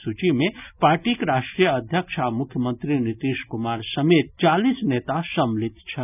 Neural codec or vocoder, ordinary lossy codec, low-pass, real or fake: none; none; 3.6 kHz; real